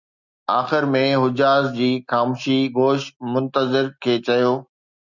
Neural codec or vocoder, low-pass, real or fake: none; 7.2 kHz; real